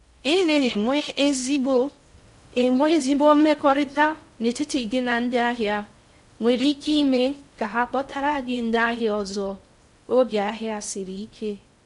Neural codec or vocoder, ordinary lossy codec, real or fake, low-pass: codec, 16 kHz in and 24 kHz out, 0.6 kbps, FocalCodec, streaming, 4096 codes; MP3, 64 kbps; fake; 10.8 kHz